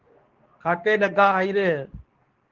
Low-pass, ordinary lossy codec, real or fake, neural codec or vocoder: 7.2 kHz; Opus, 16 kbps; fake; codec, 24 kHz, 0.9 kbps, WavTokenizer, medium speech release version 2